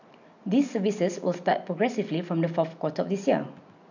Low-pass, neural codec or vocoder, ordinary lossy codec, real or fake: 7.2 kHz; none; none; real